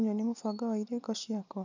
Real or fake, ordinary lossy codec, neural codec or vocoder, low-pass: real; none; none; 7.2 kHz